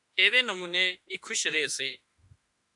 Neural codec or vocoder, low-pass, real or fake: autoencoder, 48 kHz, 32 numbers a frame, DAC-VAE, trained on Japanese speech; 10.8 kHz; fake